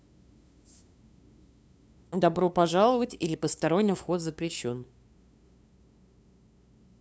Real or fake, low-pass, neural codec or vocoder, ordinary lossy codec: fake; none; codec, 16 kHz, 2 kbps, FunCodec, trained on LibriTTS, 25 frames a second; none